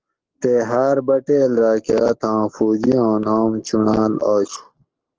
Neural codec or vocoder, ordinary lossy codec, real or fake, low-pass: codec, 44.1 kHz, 7.8 kbps, Pupu-Codec; Opus, 16 kbps; fake; 7.2 kHz